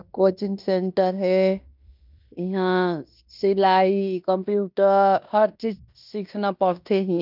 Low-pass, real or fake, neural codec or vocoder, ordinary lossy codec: 5.4 kHz; fake; codec, 16 kHz in and 24 kHz out, 0.9 kbps, LongCat-Audio-Codec, fine tuned four codebook decoder; none